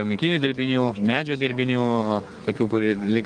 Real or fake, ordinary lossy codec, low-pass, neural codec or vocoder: fake; Opus, 32 kbps; 9.9 kHz; codec, 32 kHz, 1.9 kbps, SNAC